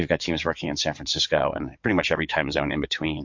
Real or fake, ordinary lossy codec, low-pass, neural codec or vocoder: fake; MP3, 48 kbps; 7.2 kHz; vocoder, 22.05 kHz, 80 mel bands, WaveNeXt